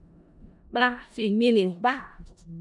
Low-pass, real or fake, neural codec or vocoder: 10.8 kHz; fake; codec, 16 kHz in and 24 kHz out, 0.4 kbps, LongCat-Audio-Codec, four codebook decoder